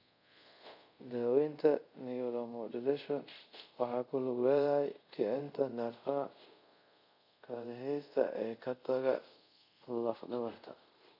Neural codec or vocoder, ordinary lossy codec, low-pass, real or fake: codec, 24 kHz, 0.5 kbps, DualCodec; none; 5.4 kHz; fake